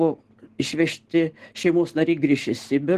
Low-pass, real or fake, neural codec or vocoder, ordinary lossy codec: 14.4 kHz; real; none; Opus, 16 kbps